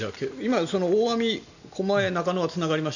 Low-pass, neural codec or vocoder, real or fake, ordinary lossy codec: 7.2 kHz; none; real; none